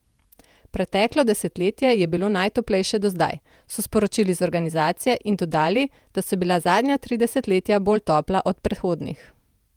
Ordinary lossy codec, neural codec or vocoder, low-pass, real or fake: Opus, 32 kbps; vocoder, 48 kHz, 128 mel bands, Vocos; 19.8 kHz; fake